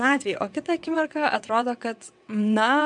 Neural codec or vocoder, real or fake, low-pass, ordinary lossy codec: vocoder, 22.05 kHz, 80 mel bands, WaveNeXt; fake; 9.9 kHz; MP3, 96 kbps